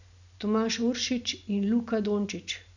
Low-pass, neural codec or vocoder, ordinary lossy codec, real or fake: 7.2 kHz; none; none; real